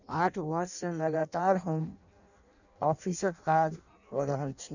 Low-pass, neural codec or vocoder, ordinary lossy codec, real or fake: 7.2 kHz; codec, 16 kHz in and 24 kHz out, 0.6 kbps, FireRedTTS-2 codec; none; fake